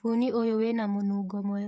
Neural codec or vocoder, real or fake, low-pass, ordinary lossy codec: codec, 16 kHz, 16 kbps, FreqCodec, smaller model; fake; none; none